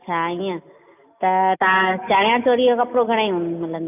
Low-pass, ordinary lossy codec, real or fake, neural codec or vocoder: 3.6 kHz; none; real; none